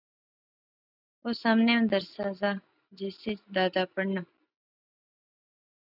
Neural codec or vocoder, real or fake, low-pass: none; real; 5.4 kHz